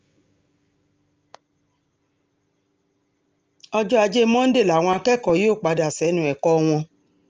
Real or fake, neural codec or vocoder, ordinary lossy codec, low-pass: real; none; Opus, 24 kbps; 7.2 kHz